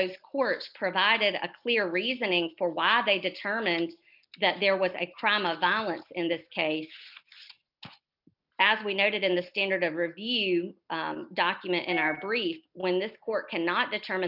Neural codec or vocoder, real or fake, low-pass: none; real; 5.4 kHz